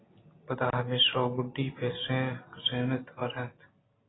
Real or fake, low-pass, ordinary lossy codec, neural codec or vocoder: real; 7.2 kHz; AAC, 16 kbps; none